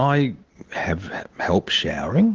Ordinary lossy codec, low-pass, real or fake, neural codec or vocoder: Opus, 16 kbps; 7.2 kHz; real; none